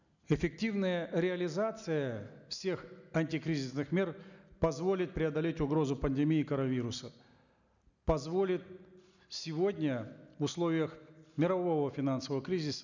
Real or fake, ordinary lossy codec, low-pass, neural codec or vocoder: real; none; 7.2 kHz; none